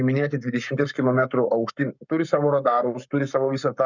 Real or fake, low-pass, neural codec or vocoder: fake; 7.2 kHz; codec, 44.1 kHz, 7.8 kbps, Pupu-Codec